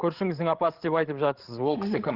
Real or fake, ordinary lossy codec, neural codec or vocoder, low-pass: fake; Opus, 16 kbps; codec, 16 kHz, 16 kbps, FreqCodec, larger model; 5.4 kHz